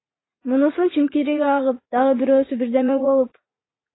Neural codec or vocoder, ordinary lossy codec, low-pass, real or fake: vocoder, 44.1 kHz, 80 mel bands, Vocos; AAC, 16 kbps; 7.2 kHz; fake